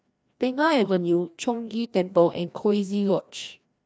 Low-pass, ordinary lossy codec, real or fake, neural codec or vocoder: none; none; fake; codec, 16 kHz, 1 kbps, FreqCodec, larger model